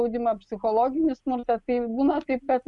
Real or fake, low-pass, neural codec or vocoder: real; 10.8 kHz; none